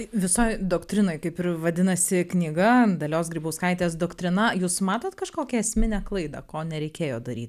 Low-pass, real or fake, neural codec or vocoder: 14.4 kHz; real; none